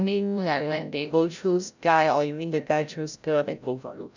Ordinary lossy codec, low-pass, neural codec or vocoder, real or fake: none; 7.2 kHz; codec, 16 kHz, 0.5 kbps, FreqCodec, larger model; fake